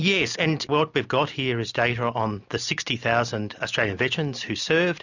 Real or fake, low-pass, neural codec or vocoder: real; 7.2 kHz; none